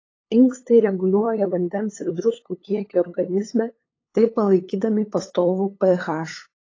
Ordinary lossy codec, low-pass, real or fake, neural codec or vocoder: AAC, 32 kbps; 7.2 kHz; fake; codec, 16 kHz, 8 kbps, FunCodec, trained on LibriTTS, 25 frames a second